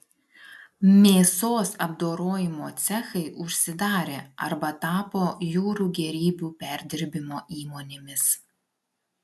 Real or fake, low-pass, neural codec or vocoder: real; 14.4 kHz; none